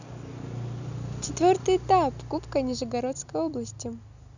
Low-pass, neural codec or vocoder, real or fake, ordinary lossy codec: 7.2 kHz; none; real; none